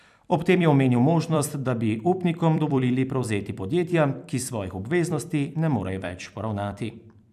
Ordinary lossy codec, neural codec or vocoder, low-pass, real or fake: none; vocoder, 44.1 kHz, 128 mel bands every 256 samples, BigVGAN v2; 14.4 kHz; fake